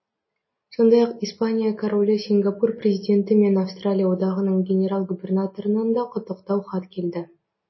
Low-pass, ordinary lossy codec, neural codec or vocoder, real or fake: 7.2 kHz; MP3, 24 kbps; none; real